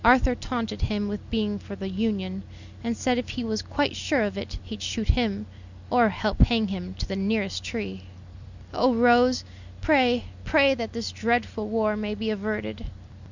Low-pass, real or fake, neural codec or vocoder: 7.2 kHz; real; none